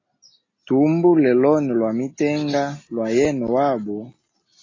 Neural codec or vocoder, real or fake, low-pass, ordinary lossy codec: none; real; 7.2 kHz; AAC, 32 kbps